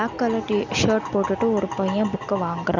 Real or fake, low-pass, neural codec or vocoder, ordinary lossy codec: real; 7.2 kHz; none; none